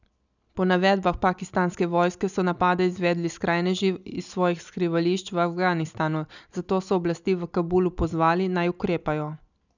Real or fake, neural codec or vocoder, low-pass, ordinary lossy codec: real; none; 7.2 kHz; none